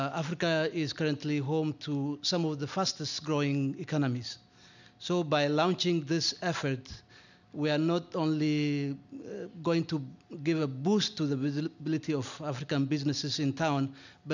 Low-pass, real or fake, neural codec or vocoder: 7.2 kHz; real; none